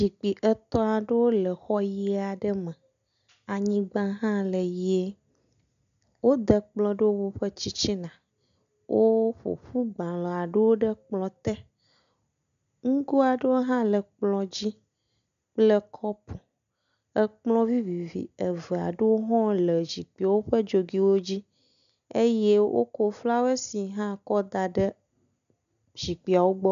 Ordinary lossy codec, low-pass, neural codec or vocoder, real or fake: MP3, 96 kbps; 7.2 kHz; none; real